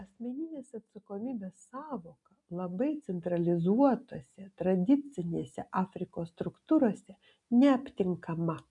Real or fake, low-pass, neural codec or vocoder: real; 10.8 kHz; none